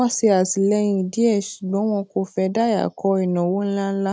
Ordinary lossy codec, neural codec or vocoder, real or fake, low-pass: none; none; real; none